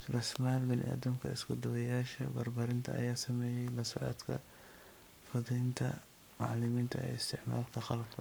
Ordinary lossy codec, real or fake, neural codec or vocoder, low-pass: none; fake; codec, 44.1 kHz, 7.8 kbps, Pupu-Codec; none